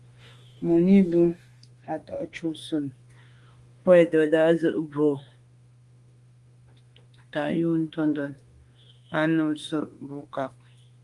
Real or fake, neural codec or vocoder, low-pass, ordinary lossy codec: fake; autoencoder, 48 kHz, 32 numbers a frame, DAC-VAE, trained on Japanese speech; 10.8 kHz; Opus, 32 kbps